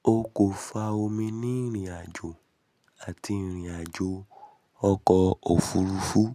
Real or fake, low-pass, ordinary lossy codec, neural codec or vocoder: real; 14.4 kHz; none; none